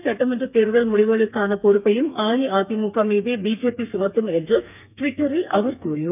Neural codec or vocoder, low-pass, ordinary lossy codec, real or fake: codec, 32 kHz, 1.9 kbps, SNAC; 3.6 kHz; none; fake